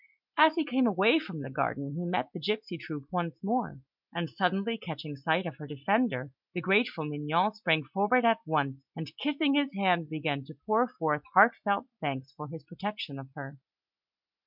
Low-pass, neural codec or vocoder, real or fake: 5.4 kHz; none; real